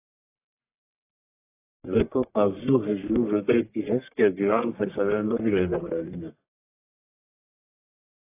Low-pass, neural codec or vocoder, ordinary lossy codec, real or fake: 3.6 kHz; codec, 44.1 kHz, 1.7 kbps, Pupu-Codec; AAC, 24 kbps; fake